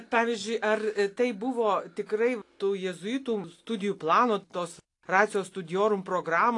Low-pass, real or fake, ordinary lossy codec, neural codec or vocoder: 10.8 kHz; real; AAC, 48 kbps; none